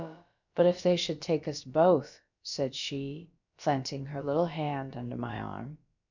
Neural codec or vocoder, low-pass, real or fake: codec, 16 kHz, about 1 kbps, DyCAST, with the encoder's durations; 7.2 kHz; fake